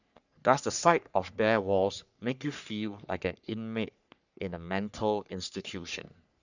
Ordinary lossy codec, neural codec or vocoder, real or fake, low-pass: none; codec, 44.1 kHz, 3.4 kbps, Pupu-Codec; fake; 7.2 kHz